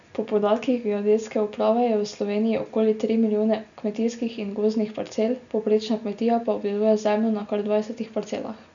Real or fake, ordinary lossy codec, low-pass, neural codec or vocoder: real; none; 7.2 kHz; none